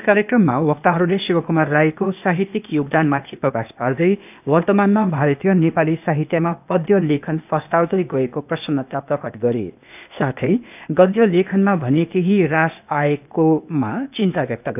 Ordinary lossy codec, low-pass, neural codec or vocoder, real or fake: none; 3.6 kHz; codec, 16 kHz, 0.8 kbps, ZipCodec; fake